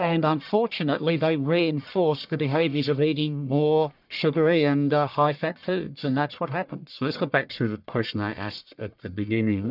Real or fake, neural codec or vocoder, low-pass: fake; codec, 44.1 kHz, 1.7 kbps, Pupu-Codec; 5.4 kHz